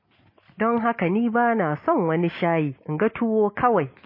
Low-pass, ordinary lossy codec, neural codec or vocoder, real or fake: 5.4 kHz; MP3, 24 kbps; none; real